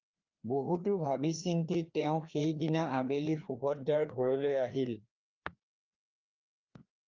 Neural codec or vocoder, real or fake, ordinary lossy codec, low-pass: codec, 16 kHz, 2 kbps, FreqCodec, larger model; fake; Opus, 32 kbps; 7.2 kHz